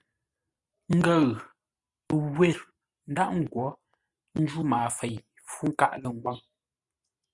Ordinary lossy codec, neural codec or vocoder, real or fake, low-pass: Opus, 64 kbps; none; real; 10.8 kHz